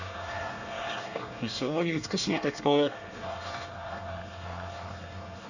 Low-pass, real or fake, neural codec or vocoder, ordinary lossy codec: 7.2 kHz; fake; codec, 24 kHz, 1 kbps, SNAC; none